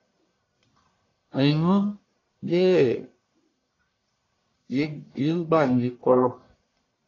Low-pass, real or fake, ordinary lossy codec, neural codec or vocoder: 7.2 kHz; fake; AAC, 32 kbps; codec, 44.1 kHz, 1.7 kbps, Pupu-Codec